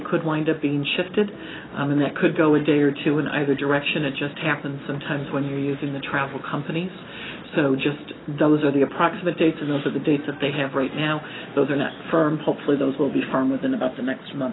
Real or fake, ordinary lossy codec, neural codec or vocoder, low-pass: real; AAC, 16 kbps; none; 7.2 kHz